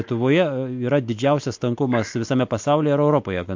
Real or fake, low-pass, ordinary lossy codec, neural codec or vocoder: real; 7.2 kHz; MP3, 48 kbps; none